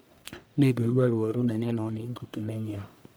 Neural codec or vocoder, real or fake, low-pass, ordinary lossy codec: codec, 44.1 kHz, 1.7 kbps, Pupu-Codec; fake; none; none